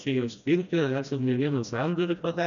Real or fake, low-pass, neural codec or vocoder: fake; 7.2 kHz; codec, 16 kHz, 1 kbps, FreqCodec, smaller model